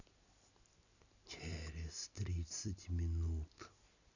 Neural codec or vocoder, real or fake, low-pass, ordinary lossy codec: none; real; 7.2 kHz; none